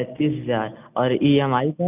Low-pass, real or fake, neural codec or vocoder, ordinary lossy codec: 3.6 kHz; real; none; none